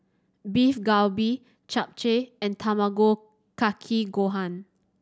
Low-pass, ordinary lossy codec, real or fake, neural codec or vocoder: none; none; real; none